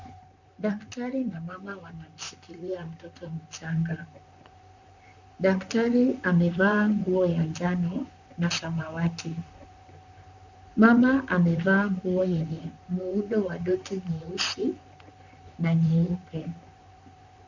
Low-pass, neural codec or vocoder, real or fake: 7.2 kHz; vocoder, 44.1 kHz, 128 mel bands, Pupu-Vocoder; fake